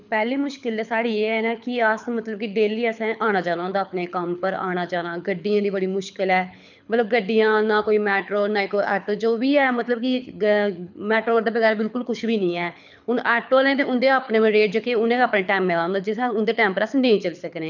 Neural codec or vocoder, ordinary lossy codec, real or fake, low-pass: codec, 24 kHz, 6 kbps, HILCodec; none; fake; 7.2 kHz